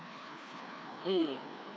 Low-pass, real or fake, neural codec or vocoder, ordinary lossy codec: none; fake; codec, 16 kHz, 2 kbps, FreqCodec, larger model; none